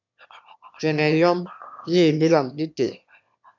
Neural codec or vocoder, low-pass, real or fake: autoencoder, 22.05 kHz, a latent of 192 numbers a frame, VITS, trained on one speaker; 7.2 kHz; fake